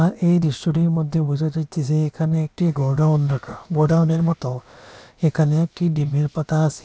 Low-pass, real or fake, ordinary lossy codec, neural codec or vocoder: none; fake; none; codec, 16 kHz, about 1 kbps, DyCAST, with the encoder's durations